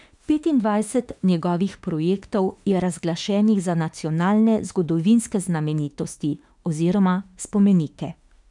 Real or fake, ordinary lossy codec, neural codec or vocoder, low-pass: fake; none; autoencoder, 48 kHz, 32 numbers a frame, DAC-VAE, trained on Japanese speech; 10.8 kHz